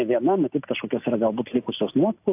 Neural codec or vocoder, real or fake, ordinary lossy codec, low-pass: none; real; AAC, 32 kbps; 3.6 kHz